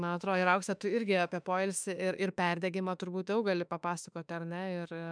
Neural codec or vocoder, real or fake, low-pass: autoencoder, 48 kHz, 32 numbers a frame, DAC-VAE, trained on Japanese speech; fake; 9.9 kHz